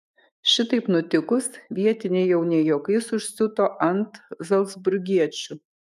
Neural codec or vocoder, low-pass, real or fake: autoencoder, 48 kHz, 128 numbers a frame, DAC-VAE, trained on Japanese speech; 14.4 kHz; fake